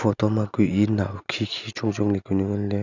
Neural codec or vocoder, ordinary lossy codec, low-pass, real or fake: none; none; 7.2 kHz; real